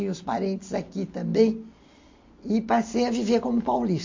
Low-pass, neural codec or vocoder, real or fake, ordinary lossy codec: 7.2 kHz; none; real; MP3, 48 kbps